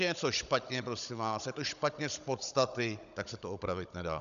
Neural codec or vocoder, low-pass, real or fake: codec, 16 kHz, 16 kbps, FunCodec, trained on Chinese and English, 50 frames a second; 7.2 kHz; fake